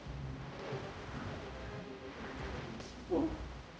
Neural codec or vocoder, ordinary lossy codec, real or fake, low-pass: codec, 16 kHz, 0.5 kbps, X-Codec, HuBERT features, trained on general audio; none; fake; none